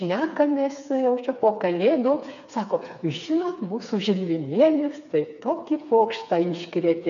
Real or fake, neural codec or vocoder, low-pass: fake; codec, 16 kHz, 4 kbps, FreqCodec, smaller model; 7.2 kHz